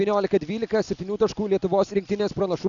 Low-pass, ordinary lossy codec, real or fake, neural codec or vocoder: 7.2 kHz; MP3, 96 kbps; real; none